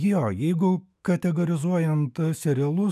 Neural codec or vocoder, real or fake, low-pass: autoencoder, 48 kHz, 128 numbers a frame, DAC-VAE, trained on Japanese speech; fake; 14.4 kHz